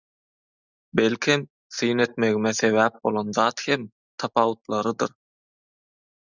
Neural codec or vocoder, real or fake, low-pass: none; real; 7.2 kHz